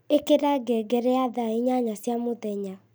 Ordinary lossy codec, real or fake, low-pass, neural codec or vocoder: none; real; none; none